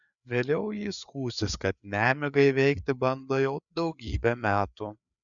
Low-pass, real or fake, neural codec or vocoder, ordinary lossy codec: 7.2 kHz; fake; codec, 16 kHz, 4 kbps, FreqCodec, larger model; MP3, 96 kbps